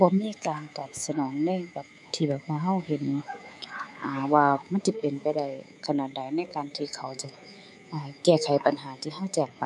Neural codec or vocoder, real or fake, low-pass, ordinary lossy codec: codec, 24 kHz, 3.1 kbps, DualCodec; fake; none; none